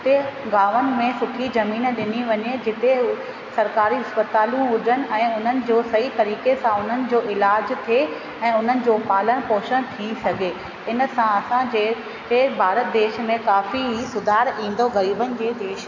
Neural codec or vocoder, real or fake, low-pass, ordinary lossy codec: none; real; 7.2 kHz; AAC, 48 kbps